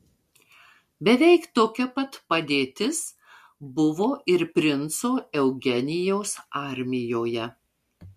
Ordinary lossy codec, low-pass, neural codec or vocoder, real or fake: MP3, 64 kbps; 14.4 kHz; none; real